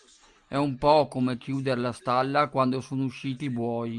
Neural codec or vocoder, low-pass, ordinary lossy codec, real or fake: none; 10.8 kHz; Opus, 32 kbps; real